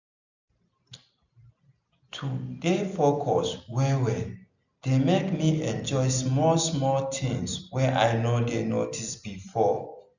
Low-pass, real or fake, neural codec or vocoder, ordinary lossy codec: 7.2 kHz; real; none; none